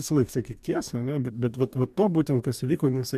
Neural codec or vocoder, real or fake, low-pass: codec, 44.1 kHz, 2.6 kbps, DAC; fake; 14.4 kHz